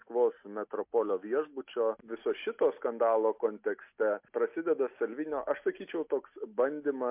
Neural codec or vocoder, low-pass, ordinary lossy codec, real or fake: none; 3.6 kHz; MP3, 32 kbps; real